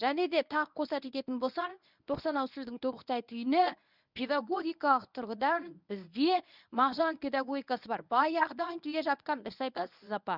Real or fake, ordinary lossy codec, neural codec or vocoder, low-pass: fake; none; codec, 24 kHz, 0.9 kbps, WavTokenizer, medium speech release version 1; 5.4 kHz